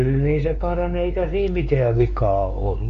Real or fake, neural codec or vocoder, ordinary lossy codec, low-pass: fake; codec, 16 kHz, 4 kbps, X-Codec, WavLM features, trained on Multilingual LibriSpeech; none; 7.2 kHz